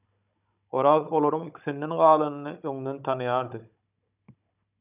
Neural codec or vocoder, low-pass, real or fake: codec, 16 kHz, 16 kbps, FunCodec, trained on Chinese and English, 50 frames a second; 3.6 kHz; fake